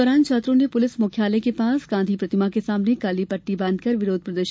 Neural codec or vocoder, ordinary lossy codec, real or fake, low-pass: none; none; real; none